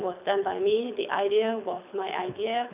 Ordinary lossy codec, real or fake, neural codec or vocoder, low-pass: none; fake; codec, 24 kHz, 6 kbps, HILCodec; 3.6 kHz